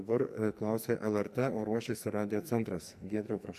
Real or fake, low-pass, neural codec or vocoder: fake; 14.4 kHz; codec, 44.1 kHz, 2.6 kbps, SNAC